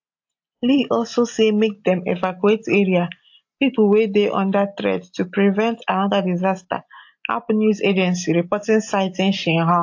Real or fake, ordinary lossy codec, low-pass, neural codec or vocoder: real; AAC, 48 kbps; 7.2 kHz; none